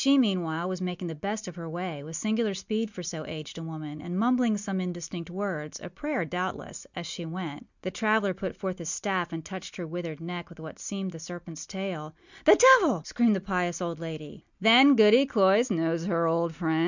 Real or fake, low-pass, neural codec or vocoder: real; 7.2 kHz; none